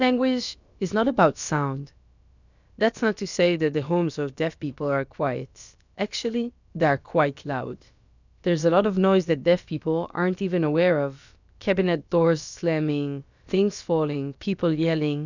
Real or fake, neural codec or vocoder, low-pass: fake; codec, 16 kHz, about 1 kbps, DyCAST, with the encoder's durations; 7.2 kHz